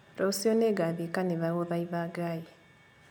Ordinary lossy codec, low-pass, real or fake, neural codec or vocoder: none; none; real; none